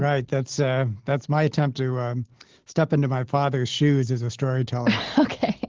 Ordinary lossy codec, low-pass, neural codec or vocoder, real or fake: Opus, 16 kbps; 7.2 kHz; none; real